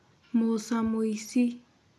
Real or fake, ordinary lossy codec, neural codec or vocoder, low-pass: real; none; none; none